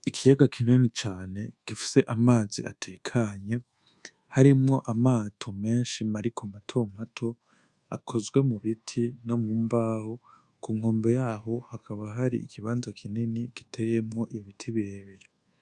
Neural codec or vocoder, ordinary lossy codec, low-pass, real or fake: codec, 24 kHz, 1.2 kbps, DualCodec; Opus, 64 kbps; 10.8 kHz; fake